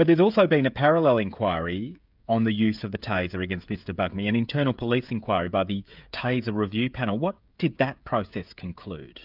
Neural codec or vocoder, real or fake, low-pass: codec, 16 kHz, 16 kbps, FreqCodec, smaller model; fake; 5.4 kHz